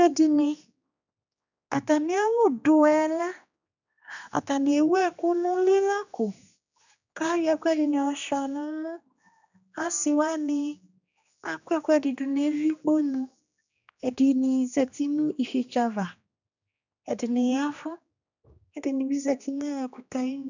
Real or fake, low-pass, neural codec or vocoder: fake; 7.2 kHz; codec, 16 kHz, 2 kbps, X-Codec, HuBERT features, trained on general audio